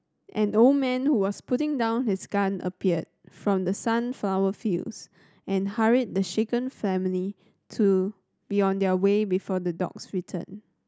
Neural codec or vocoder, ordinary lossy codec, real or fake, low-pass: none; none; real; none